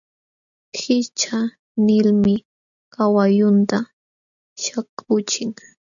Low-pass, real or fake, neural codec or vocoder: 7.2 kHz; real; none